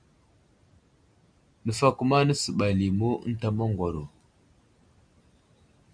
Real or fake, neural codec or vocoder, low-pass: real; none; 9.9 kHz